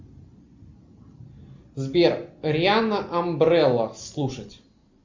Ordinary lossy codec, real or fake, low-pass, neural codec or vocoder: MP3, 64 kbps; real; 7.2 kHz; none